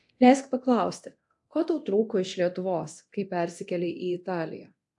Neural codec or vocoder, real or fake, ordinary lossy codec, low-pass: codec, 24 kHz, 0.9 kbps, DualCodec; fake; AAC, 64 kbps; 10.8 kHz